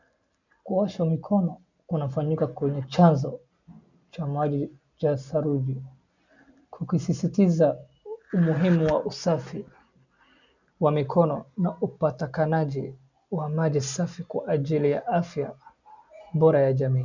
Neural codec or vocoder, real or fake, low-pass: none; real; 7.2 kHz